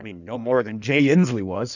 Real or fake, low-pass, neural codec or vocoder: fake; 7.2 kHz; codec, 16 kHz in and 24 kHz out, 1.1 kbps, FireRedTTS-2 codec